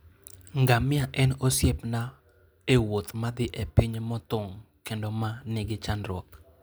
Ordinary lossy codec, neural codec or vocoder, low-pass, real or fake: none; none; none; real